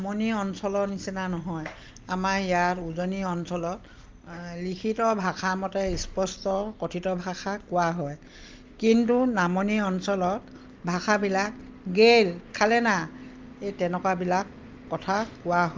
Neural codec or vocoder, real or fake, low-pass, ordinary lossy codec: none; real; 7.2 kHz; Opus, 32 kbps